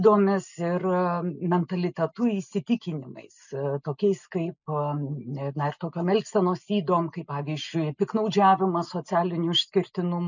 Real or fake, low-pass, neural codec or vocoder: real; 7.2 kHz; none